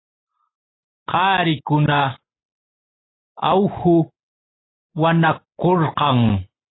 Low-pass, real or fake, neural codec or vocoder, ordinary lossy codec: 7.2 kHz; real; none; AAC, 16 kbps